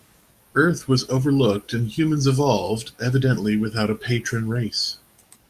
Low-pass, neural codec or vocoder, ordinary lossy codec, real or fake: 14.4 kHz; codec, 44.1 kHz, 7.8 kbps, DAC; Opus, 64 kbps; fake